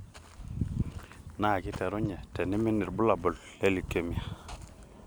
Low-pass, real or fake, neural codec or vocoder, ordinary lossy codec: none; real; none; none